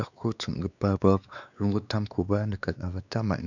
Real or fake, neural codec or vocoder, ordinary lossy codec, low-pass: fake; codec, 16 kHz, 2 kbps, X-Codec, WavLM features, trained on Multilingual LibriSpeech; none; 7.2 kHz